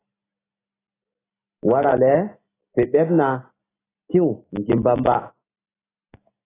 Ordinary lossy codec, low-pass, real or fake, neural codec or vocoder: AAC, 16 kbps; 3.6 kHz; real; none